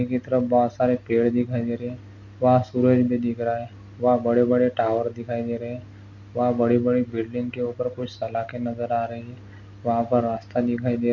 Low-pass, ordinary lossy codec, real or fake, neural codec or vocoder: 7.2 kHz; none; real; none